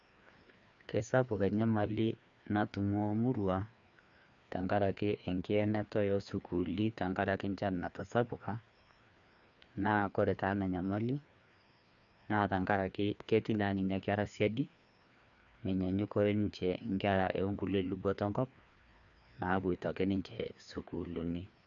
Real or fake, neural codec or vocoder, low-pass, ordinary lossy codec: fake; codec, 16 kHz, 2 kbps, FreqCodec, larger model; 7.2 kHz; none